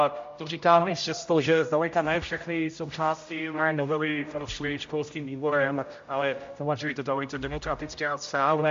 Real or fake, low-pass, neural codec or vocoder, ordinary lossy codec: fake; 7.2 kHz; codec, 16 kHz, 0.5 kbps, X-Codec, HuBERT features, trained on general audio; MP3, 48 kbps